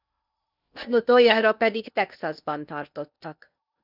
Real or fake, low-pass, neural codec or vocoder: fake; 5.4 kHz; codec, 16 kHz in and 24 kHz out, 0.8 kbps, FocalCodec, streaming, 65536 codes